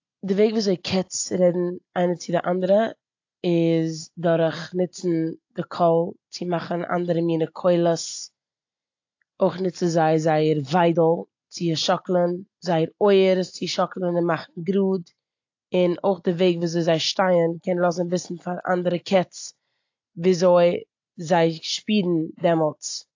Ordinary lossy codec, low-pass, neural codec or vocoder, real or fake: AAC, 48 kbps; 7.2 kHz; none; real